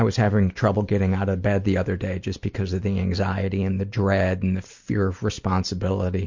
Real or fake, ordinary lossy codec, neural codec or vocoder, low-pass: real; MP3, 48 kbps; none; 7.2 kHz